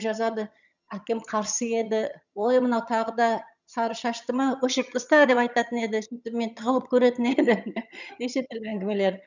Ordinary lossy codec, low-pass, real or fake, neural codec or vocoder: none; 7.2 kHz; fake; vocoder, 22.05 kHz, 80 mel bands, HiFi-GAN